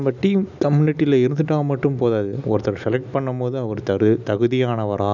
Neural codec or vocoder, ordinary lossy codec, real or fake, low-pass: none; none; real; 7.2 kHz